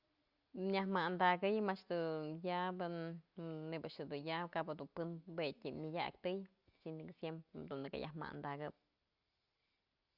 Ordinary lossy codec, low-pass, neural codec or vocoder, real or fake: Opus, 64 kbps; 5.4 kHz; none; real